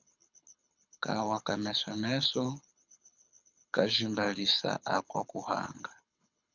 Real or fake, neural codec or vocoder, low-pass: fake; codec, 24 kHz, 6 kbps, HILCodec; 7.2 kHz